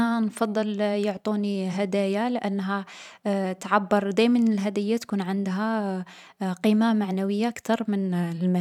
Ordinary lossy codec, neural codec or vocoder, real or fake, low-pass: none; vocoder, 44.1 kHz, 128 mel bands every 512 samples, BigVGAN v2; fake; 19.8 kHz